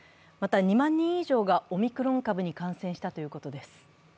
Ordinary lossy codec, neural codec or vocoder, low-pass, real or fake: none; none; none; real